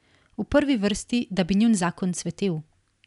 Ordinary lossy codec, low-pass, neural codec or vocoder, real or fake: none; 10.8 kHz; none; real